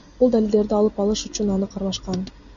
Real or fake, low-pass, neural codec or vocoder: real; 7.2 kHz; none